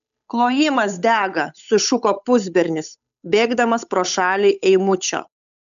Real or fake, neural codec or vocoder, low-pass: fake; codec, 16 kHz, 8 kbps, FunCodec, trained on Chinese and English, 25 frames a second; 7.2 kHz